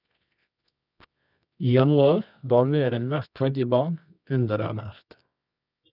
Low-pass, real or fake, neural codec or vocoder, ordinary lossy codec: 5.4 kHz; fake; codec, 24 kHz, 0.9 kbps, WavTokenizer, medium music audio release; none